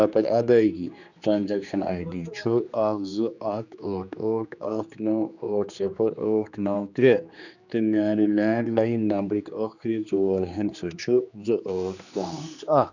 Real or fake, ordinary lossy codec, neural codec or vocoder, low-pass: fake; none; codec, 16 kHz, 2 kbps, X-Codec, HuBERT features, trained on general audio; 7.2 kHz